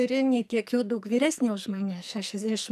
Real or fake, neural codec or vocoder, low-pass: fake; codec, 44.1 kHz, 2.6 kbps, SNAC; 14.4 kHz